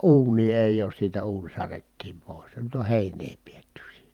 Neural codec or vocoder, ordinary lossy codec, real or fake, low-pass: vocoder, 44.1 kHz, 128 mel bands every 512 samples, BigVGAN v2; Opus, 32 kbps; fake; 19.8 kHz